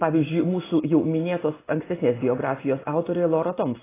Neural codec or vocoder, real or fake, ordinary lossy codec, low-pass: none; real; AAC, 16 kbps; 3.6 kHz